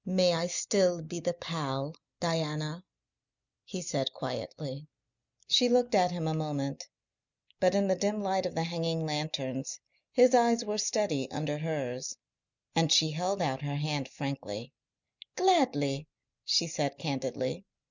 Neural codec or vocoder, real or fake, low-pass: none; real; 7.2 kHz